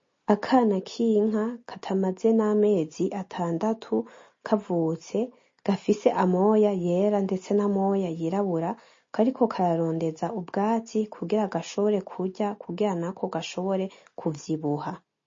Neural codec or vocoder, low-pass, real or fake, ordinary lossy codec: none; 7.2 kHz; real; MP3, 32 kbps